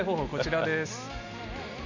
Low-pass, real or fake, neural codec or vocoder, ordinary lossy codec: 7.2 kHz; real; none; none